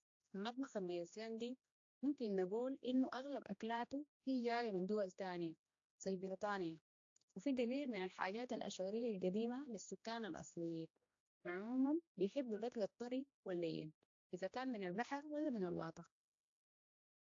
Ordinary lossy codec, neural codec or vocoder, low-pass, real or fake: AAC, 48 kbps; codec, 16 kHz, 1 kbps, X-Codec, HuBERT features, trained on general audio; 7.2 kHz; fake